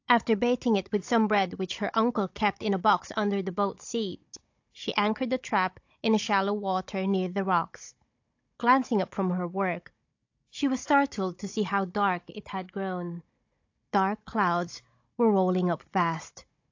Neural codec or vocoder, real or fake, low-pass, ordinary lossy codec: codec, 16 kHz, 16 kbps, FunCodec, trained on Chinese and English, 50 frames a second; fake; 7.2 kHz; AAC, 48 kbps